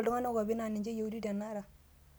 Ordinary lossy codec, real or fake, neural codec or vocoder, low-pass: none; real; none; none